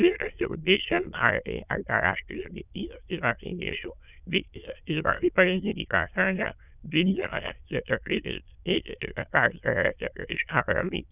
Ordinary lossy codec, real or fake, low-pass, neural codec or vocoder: none; fake; 3.6 kHz; autoencoder, 22.05 kHz, a latent of 192 numbers a frame, VITS, trained on many speakers